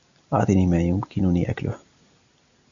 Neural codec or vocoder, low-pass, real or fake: none; 7.2 kHz; real